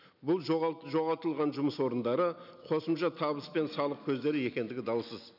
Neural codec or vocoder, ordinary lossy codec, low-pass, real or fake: none; none; 5.4 kHz; real